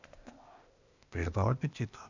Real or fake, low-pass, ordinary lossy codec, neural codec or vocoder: fake; 7.2 kHz; none; codec, 16 kHz, 0.8 kbps, ZipCodec